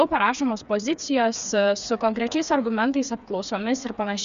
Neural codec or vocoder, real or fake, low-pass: codec, 16 kHz, 4 kbps, FreqCodec, smaller model; fake; 7.2 kHz